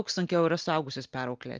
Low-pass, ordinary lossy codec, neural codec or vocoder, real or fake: 7.2 kHz; Opus, 32 kbps; none; real